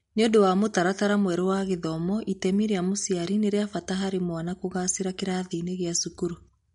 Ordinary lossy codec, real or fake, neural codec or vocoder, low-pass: MP3, 48 kbps; real; none; 19.8 kHz